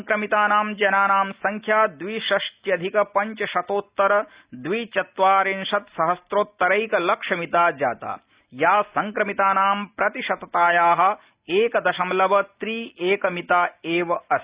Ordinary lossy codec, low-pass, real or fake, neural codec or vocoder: Opus, 64 kbps; 3.6 kHz; real; none